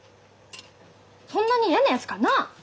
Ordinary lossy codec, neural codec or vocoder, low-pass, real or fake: none; none; none; real